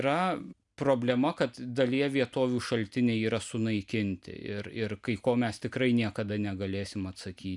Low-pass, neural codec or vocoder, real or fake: 10.8 kHz; none; real